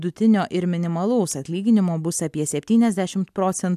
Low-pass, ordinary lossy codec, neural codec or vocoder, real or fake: 14.4 kHz; AAC, 96 kbps; none; real